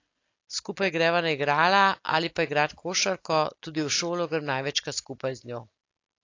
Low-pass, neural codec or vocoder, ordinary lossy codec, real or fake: 7.2 kHz; none; AAC, 48 kbps; real